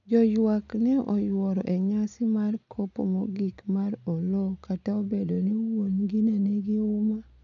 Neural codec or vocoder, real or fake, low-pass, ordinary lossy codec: none; real; 7.2 kHz; none